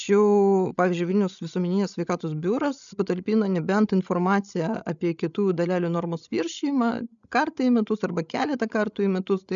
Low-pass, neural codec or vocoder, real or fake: 7.2 kHz; codec, 16 kHz, 16 kbps, FreqCodec, larger model; fake